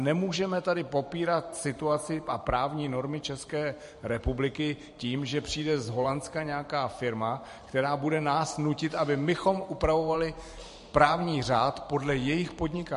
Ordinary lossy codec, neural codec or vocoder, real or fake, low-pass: MP3, 48 kbps; vocoder, 44.1 kHz, 128 mel bands every 512 samples, BigVGAN v2; fake; 14.4 kHz